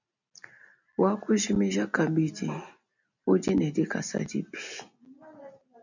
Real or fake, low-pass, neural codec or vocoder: real; 7.2 kHz; none